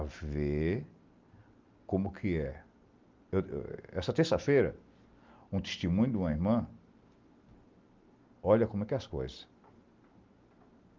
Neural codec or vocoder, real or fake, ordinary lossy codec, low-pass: none; real; Opus, 24 kbps; 7.2 kHz